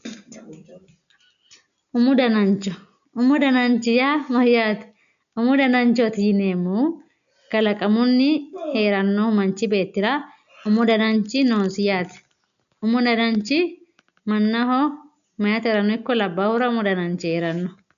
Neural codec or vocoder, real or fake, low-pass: none; real; 7.2 kHz